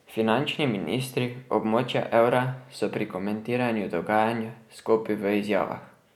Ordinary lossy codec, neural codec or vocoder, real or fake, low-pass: none; none; real; 19.8 kHz